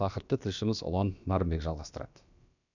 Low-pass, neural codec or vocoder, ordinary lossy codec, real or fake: 7.2 kHz; codec, 16 kHz, about 1 kbps, DyCAST, with the encoder's durations; none; fake